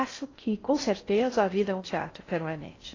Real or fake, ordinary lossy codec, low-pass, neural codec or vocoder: fake; AAC, 32 kbps; 7.2 kHz; codec, 16 kHz in and 24 kHz out, 0.6 kbps, FocalCodec, streaming, 4096 codes